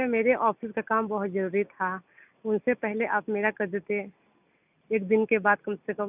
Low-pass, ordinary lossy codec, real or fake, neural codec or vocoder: 3.6 kHz; none; real; none